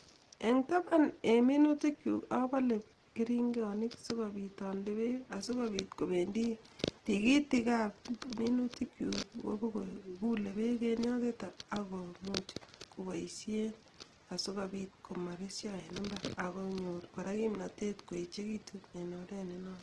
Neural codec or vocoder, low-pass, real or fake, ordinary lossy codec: none; 10.8 kHz; real; Opus, 16 kbps